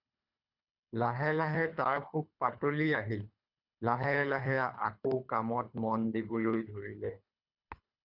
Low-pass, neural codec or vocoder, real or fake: 5.4 kHz; codec, 24 kHz, 3 kbps, HILCodec; fake